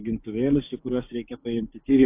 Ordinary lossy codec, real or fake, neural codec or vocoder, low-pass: AAC, 24 kbps; fake; codec, 16 kHz, 6 kbps, DAC; 3.6 kHz